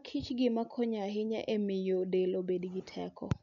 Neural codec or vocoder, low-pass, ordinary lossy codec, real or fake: none; 7.2 kHz; none; real